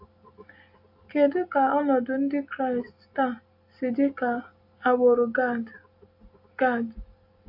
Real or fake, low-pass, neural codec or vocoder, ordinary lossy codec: real; 5.4 kHz; none; AAC, 48 kbps